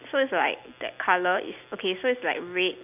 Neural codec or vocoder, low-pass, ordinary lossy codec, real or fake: none; 3.6 kHz; none; real